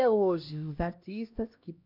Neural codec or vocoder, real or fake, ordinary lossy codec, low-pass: codec, 16 kHz, 1 kbps, X-Codec, HuBERT features, trained on LibriSpeech; fake; MP3, 48 kbps; 5.4 kHz